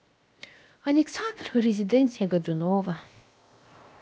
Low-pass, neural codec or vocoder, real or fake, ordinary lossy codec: none; codec, 16 kHz, 0.7 kbps, FocalCodec; fake; none